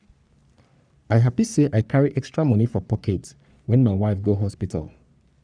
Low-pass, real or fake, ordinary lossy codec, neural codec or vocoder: 9.9 kHz; fake; none; codec, 44.1 kHz, 3.4 kbps, Pupu-Codec